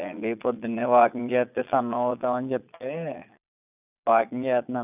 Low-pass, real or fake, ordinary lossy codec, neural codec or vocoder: 3.6 kHz; fake; none; vocoder, 22.05 kHz, 80 mel bands, Vocos